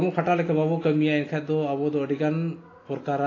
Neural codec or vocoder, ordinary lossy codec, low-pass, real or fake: none; AAC, 32 kbps; 7.2 kHz; real